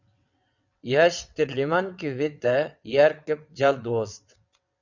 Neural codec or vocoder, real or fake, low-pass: vocoder, 22.05 kHz, 80 mel bands, WaveNeXt; fake; 7.2 kHz